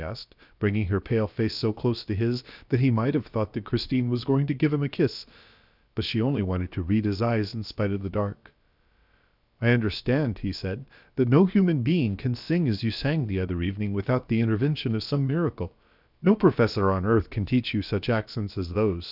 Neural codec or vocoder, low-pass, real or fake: codec, 16 kHz, about 1 kbps, DyCAST, with the encoder's durations; 5.4 kHz; fake